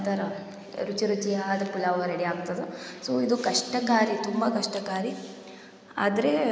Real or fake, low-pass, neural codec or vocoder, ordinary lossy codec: real; none; none; none